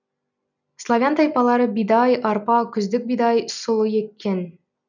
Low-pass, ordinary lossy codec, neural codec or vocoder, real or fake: 7.2 kHz; none; none; real